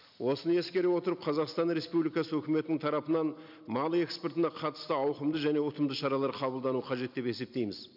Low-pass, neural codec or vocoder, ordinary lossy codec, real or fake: 5.4 kHz; none; none; real